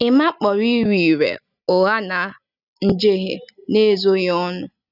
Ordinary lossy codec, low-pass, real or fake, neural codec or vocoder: none; 5.4 kHz; real; none